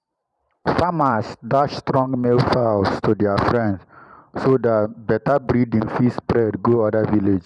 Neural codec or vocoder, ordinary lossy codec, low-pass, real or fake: vocoder, 44.1 kHz, 128 mel bands every 512 samples, BigVGAN v2; none; 10.8 kHz; fake